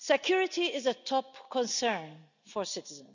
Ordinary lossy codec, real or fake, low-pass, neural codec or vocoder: none; real; 7.2 kHz; none